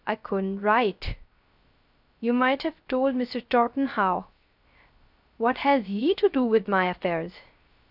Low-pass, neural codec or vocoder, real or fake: 5.4 kHz; codec, 16 kHz, 0.3 kbps, FocalCodec; fake